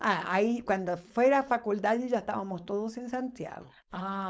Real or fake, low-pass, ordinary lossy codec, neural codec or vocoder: fake; none; none; codec, 16 kHz, 4.8 kbps, FACodec